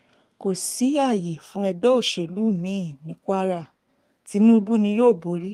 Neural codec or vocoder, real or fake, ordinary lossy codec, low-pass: codec, 32 kHz, 1.9 kbps, SNAC; fake; Opus, 32 kbps; 14.4 kHz